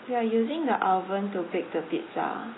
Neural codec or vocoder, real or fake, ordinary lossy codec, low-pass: none; real; AAC, 16 kbps; 7.2 kHz